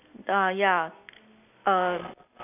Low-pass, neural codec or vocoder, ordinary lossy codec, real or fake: 3.6 kHz; none; none; real